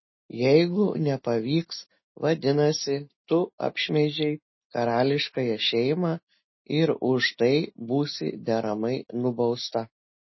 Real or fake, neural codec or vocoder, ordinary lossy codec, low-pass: real; none; MP3, 24 kbps; 7.2 kHz